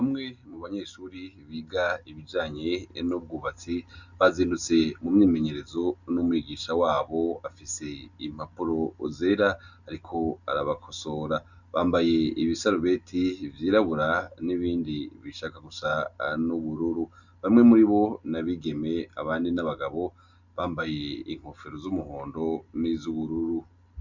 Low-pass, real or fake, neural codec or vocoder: 7.2 kHz; real; none